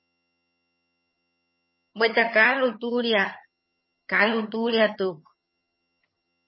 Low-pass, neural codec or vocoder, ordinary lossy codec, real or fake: 7.2 kHz; vocoder, 22.05 kHz, 80 mel bands, HiFi-GAN; MP3, 24 kbps; fake